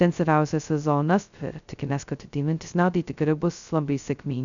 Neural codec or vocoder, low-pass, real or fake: codec, 16 kHz, 0.2 kbps, FocalCodec; 7.2 kHz; fake